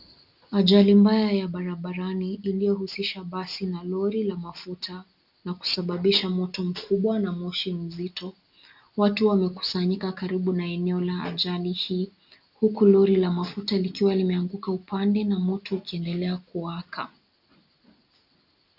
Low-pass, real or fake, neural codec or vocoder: 5.4 kHz; real; none